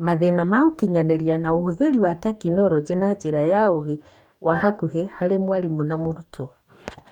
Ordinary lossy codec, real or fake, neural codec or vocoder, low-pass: none; fake; codec, 44.1 kHz, 2.6 kbps, DAC; 19.8 kHz